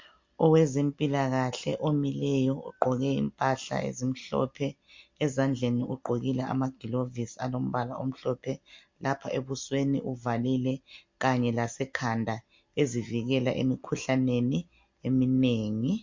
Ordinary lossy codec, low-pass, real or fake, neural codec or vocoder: MP3, 48 kbps; 7.2 kHz; real; none